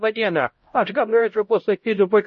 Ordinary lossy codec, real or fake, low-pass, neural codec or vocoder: MP3, 32 kbps; fake; 7.2 kHz; codec, 16 kHz, 0.5 kbps, X-Codec, HuBERT features, trained on LibriSpeech